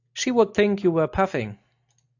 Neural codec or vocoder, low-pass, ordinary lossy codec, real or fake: none; 7.2 kHz; AAC, 48 kbps; real